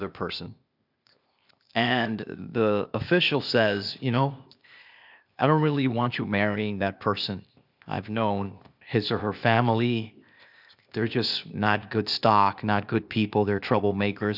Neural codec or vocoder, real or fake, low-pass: codec, 16 kHz, 0.8 kbps, ZipCodec; fake; 5.4 kHz